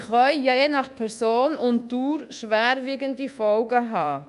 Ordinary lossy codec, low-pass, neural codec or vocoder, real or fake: none; 10.8 kHz; codec, 24 kHz, 1.2 kbps, DualCodec; fake